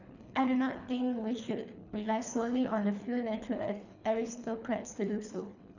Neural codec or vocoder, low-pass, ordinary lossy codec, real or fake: codec, 24 kHz, 3 kbps, HILCodec; 7.2 kHz; none; fake